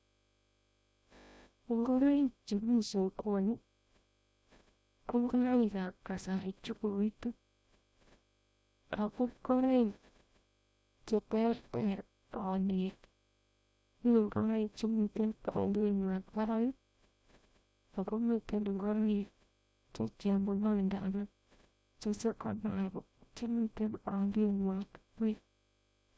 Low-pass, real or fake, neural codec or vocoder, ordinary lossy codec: none; fake; codec, 16 kHz, 0.5 kbps, FreqCodec, larger model; none